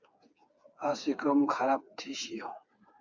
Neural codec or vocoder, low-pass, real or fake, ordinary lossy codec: codec, 16 kHz, 4 kbps, FreqCodec, smaller model; 7.2 kHz; fake; Opus, 64 kbps